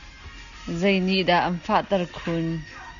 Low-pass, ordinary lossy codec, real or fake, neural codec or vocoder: 7.2 kHz; Opus, 64 kbps; real; none